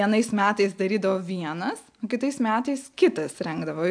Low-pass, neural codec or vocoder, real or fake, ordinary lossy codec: 9.9 kHz; none; real; AAC, 64 kbps